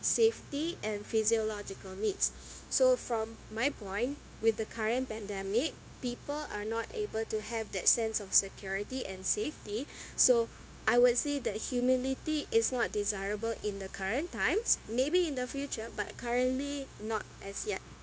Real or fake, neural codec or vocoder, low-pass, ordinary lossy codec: fake; codec, 16 kHz, 0.9 kbps, LongCat-Audio-Codec; none; none